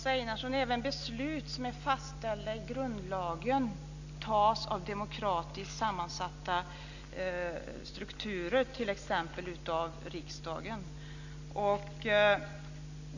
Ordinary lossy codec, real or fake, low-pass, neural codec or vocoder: none; real; 7.2 kHz; none